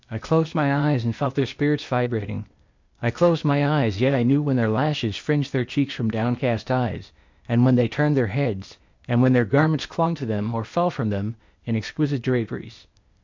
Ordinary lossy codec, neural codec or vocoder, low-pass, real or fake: MP3, 64 kbps; codec, 16 kHz, 0.8 kbps, ZipCodec; 7.2 kHz; fake